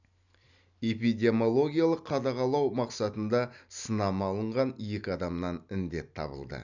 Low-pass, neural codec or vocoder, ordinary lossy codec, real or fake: 7.2 kHz; none; none; real